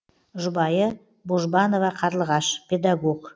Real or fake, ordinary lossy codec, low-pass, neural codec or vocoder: real; none; none; none